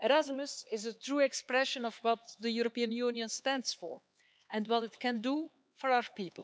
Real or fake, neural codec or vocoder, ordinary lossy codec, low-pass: fake; codec, 16 kHz, 4 kbps, X-Codec, HuBERT features, trained on LibriSpeech; none; none